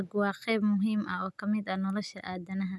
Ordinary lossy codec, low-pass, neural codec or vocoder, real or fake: none; none; none; real